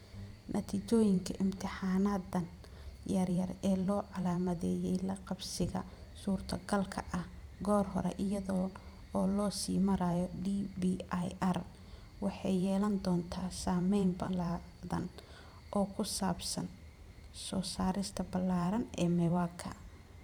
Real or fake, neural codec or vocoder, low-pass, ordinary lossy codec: fake; vocoder, 44.1 kHz, 128 mel bands every 512 samples, BigVGAN v2; 19.8 kHz; none